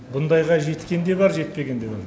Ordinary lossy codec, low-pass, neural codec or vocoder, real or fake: none; none; none; real